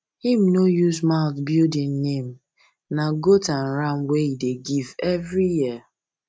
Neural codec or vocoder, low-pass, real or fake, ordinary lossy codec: none; none; real; none